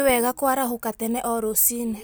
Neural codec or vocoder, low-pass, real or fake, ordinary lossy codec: none; none; real; none